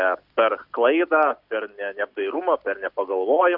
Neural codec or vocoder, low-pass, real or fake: none; 5.4 kHz; real